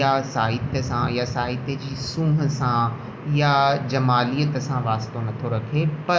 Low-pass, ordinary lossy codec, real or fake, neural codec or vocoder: none; none; real; none